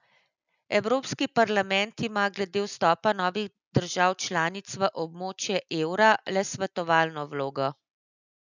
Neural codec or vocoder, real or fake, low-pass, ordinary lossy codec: none; real; 7.2 kHz; none